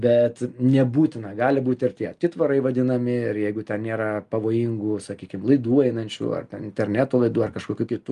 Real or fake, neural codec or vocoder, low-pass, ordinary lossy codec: real; none; 10.8 kHz; Opus, 32 kbps